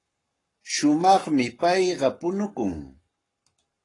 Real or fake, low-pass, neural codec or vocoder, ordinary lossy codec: fake; 10.8 kHz; codec, 44.1 kHz, 7.8 kbps, Pupu-Codec; AAC, 32 kbps